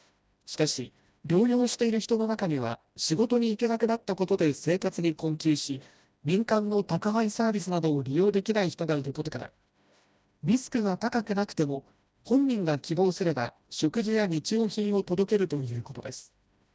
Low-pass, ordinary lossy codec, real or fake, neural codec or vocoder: none; none; fake; codec, 16 kHz, 1 kbps, FreqCodec, smaller model